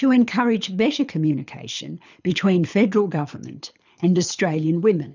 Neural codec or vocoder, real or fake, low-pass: codec, 24 kHz, 6 kbps, HILCodec; fake; 7.2 kHz